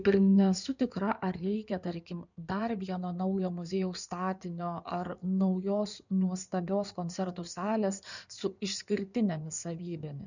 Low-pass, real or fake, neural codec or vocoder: 7.2 kHz; fake; codec, 16 kHz in and 24 kHz out, 2.2 kbps, FireRedTTS-2 codec